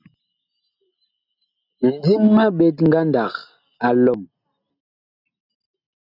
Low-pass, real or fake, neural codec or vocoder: 5.4 kHz; real; none